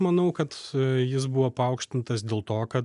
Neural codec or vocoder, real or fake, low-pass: none; real; 10.8 kHz